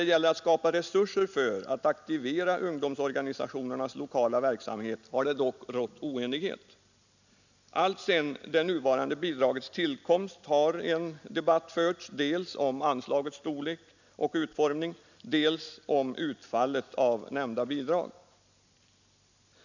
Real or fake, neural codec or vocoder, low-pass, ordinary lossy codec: real; none; 7.2 kHz; none